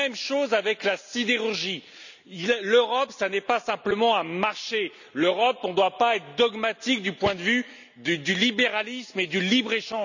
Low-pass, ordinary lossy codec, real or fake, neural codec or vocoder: 7.2 kHz; none; real; none